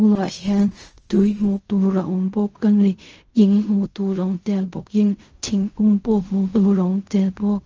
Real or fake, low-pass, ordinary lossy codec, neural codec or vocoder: fake; 7.2 kHz; Opus, 24 kbps; codec, 16 kHz in and 24 kHz out, 0.4 kbps, LongCat-Audio-Codec, fine tuned four codebook decoder